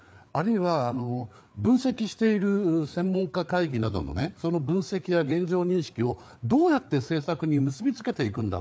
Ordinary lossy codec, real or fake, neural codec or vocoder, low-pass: none; fake; codec, 16 kHz, 4 kbps, FreqCodec, larger model; none